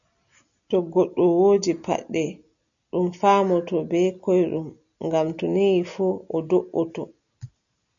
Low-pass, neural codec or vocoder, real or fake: 7.2 kHz; none; real